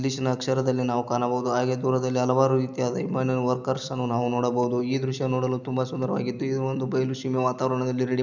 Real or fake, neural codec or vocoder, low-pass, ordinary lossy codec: real; none; 7.2 kHz; none